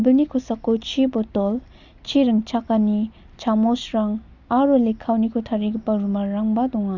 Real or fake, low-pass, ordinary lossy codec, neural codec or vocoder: real; 7.2 kHz; Opus, 64 kbps; none